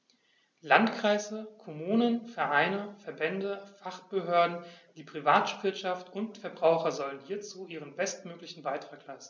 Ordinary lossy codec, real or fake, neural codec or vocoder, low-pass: none; real; none; none